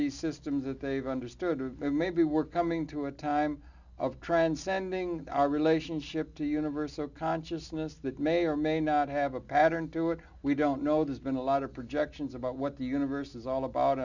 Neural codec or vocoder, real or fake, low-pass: none; real; 7.2 kHz